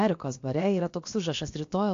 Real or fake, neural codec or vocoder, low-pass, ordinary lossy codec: fake; codec, 16 kHz, about 1 kbps, DyCAST, with the encoder's durations; 7.2 kHz; AAC, 48 kbps